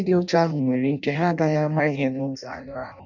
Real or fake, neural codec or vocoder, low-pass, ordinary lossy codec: fake; codec, 16 kHz in and 24 kHz out, 0.6 kbps, FireRedTTS-2 codec; 7.2 kHz; none